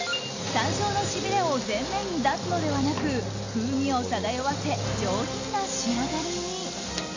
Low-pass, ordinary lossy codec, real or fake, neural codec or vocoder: 7.2 kHz; AAC, 48 kbps; real; none